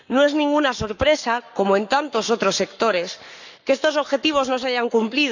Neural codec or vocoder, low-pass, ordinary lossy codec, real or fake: codec, 44.1 kHz, 7.8 kbps, Pupu-Codec; 7.2 kHz; none; fake